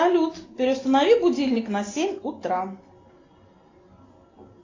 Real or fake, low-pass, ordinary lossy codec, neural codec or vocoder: real; 7.2 kHz; AAC, 32 kbps; none